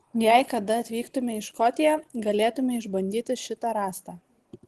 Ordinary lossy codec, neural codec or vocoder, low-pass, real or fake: Opus, 16 kbps; none; 10.8 kHz; real